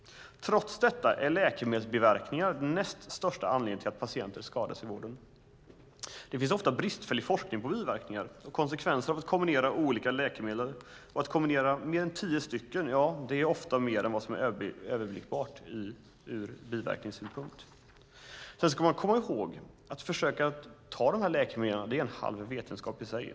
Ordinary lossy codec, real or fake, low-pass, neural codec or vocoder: none; real; none; none